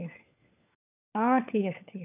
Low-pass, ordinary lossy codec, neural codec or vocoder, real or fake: 3.6 kHz; none; codec, 16 kHz, 4 kbps, FunCodec, trained on LibriTTS, 50 frames a second; fake